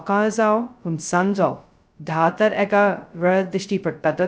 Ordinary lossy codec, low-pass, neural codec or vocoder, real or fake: none; none; codec, 16 kHz, 0.2 kbps, FocalCodec; fake